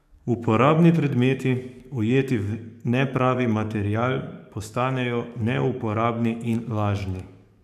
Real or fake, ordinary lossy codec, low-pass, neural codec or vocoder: fake; none; 14.4 kHz; codec, 44.1 kHz, 7.8 kbps, DAC